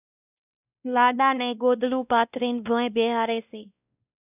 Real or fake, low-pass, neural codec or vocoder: fake; 3.6 kHz; codec, 16 kHz, 1 kbps, X-Codec, WavLM features, trained on Multilingual LibriSpeech